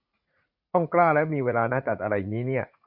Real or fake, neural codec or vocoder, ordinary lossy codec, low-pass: fake; vocoder, 44.1 kHz, 80 mel bands, Vocos; MP3, 48 kbps; 5.4 kHz